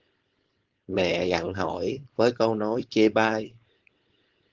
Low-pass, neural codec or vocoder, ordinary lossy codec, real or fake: 7.2 kHz; codec, 16 kHz, 4.8 kbps, FACodec; Opus, 16 kbps; fake